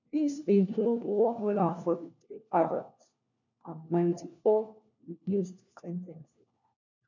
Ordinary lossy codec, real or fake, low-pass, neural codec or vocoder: none; fake; 7.2 kHz; codec, 16 kHz, 1 kbps, FunCodec, trained on LibriTTS, 50 frames a second